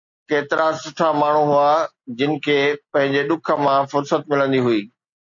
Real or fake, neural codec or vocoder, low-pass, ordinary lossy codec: real; none; 7.2 kHz; AAC, 64 kbps